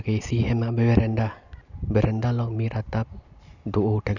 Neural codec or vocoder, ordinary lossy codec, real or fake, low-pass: none; none; real; 7.2 kHz